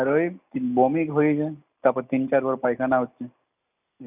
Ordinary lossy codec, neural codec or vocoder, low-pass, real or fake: none; none; 3.6 kHz; real